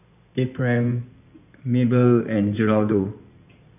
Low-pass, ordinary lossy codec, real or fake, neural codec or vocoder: 3.6 kHz; none; fake; codec, 16 kHz in and 24 kHz out, 2.2 kbps, FireRedTTS-2 codec